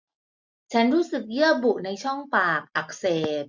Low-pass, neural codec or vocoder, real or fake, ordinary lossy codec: 7.2 kHz; none; real; MP3, 64 kbps